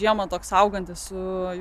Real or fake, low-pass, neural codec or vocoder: real; 14.4 kHz; none